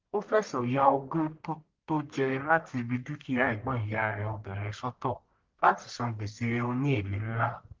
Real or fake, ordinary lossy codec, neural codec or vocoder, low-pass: fake; Opus, 32 kbps; codec, 44.1 kHz, 1.7 kbps, Pupu-Codec; 7.2 kHz